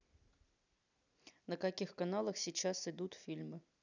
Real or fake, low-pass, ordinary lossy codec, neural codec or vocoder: real; 7.2 kHz; none; none